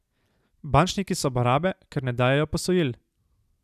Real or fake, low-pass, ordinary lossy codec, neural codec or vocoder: real; 14.4 kHz; none; none